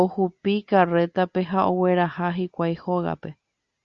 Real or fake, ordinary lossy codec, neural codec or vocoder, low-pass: real; Opus, 64 kbps; none; 7.2 kHz